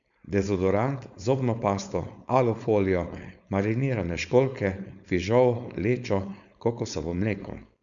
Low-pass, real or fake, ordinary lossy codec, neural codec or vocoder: 7.2 kHz; fake; MP3, 96 kbps; codec, 16 kHz, 4.8 kbps, FACodec